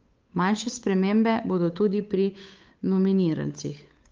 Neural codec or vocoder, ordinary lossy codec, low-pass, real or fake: codec, 16 kHz, 8 kbps, FunCodec, trained on Chinese and English, 25 frames a second; Opus, 24 kbps; 7.2 kHz; fake